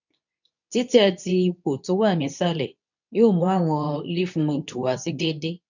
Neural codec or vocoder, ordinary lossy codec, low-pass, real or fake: codec, 24 kHz, 0.9 kbps, WavTokenizer, medium speech release version 2; none; 7.2 kHz; fake